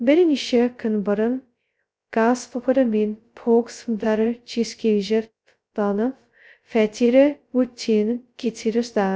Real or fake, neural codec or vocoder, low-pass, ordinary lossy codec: fake; codec, 16 kHz, 0.2 kbps, FocalCodec; none; none